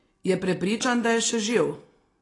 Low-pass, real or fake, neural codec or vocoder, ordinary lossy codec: 10.8 kHz; real; none; AAC, 32 kbps